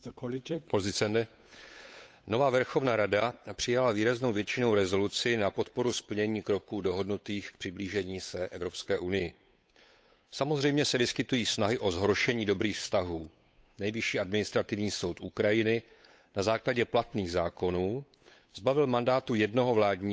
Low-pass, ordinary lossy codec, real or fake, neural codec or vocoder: none; none; fake; codec, 16 kHz, 8 kbps, FunCodec, trained on Chinese and English, 25 frames a second